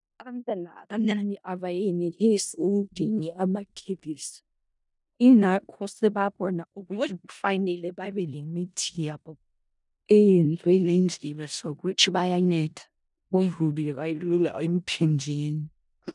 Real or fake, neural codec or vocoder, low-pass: fake; codec, 16 kHz in and 24 kHz out, 0.4 kbps, LongCat-Audio-Codec, four codebook decoder; 10.8 kHz